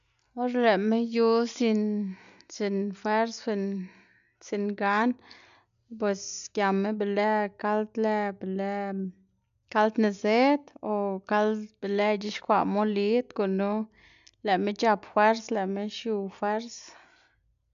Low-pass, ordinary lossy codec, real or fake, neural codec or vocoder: 7.2 kHz; none; real; none